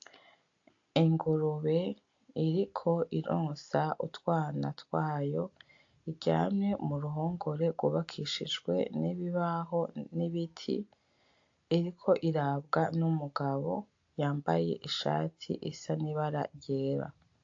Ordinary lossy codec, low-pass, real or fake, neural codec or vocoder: AAC, 48 kbps; 7.2 kHz; real; none